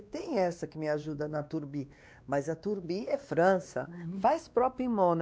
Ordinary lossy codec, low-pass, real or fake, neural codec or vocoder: none; none; fake; codec, 16 kHz, 2 kbps, X-Codec, WavLM features, trained on Multilingual LibriSpeech